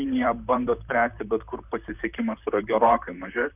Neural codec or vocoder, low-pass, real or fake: vocoder, 44.1 kHz, 128 mel bands, Pupu-Vocoder; 3.6 kHz; fake